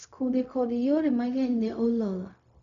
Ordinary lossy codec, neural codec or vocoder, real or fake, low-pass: none; codec, 16 kHz, 0.4 kbps, LongCat-Audio-Codec; fake; 7.2 kHz